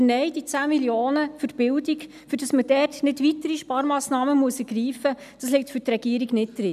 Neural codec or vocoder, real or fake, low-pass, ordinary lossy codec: none; real; 14.4 kHz; none